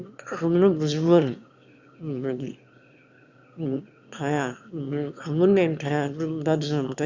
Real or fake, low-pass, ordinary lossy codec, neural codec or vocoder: fake; 7.2 kHz; Opus, 64 kbps; autoencoder, 22.05 kHz, a latent of 192 numbers a frame, VITS, trained on one speaker